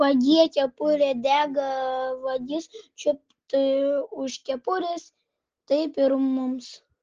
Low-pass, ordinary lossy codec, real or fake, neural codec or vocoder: 7.2 kHz; Opus, 16 kbps; real; none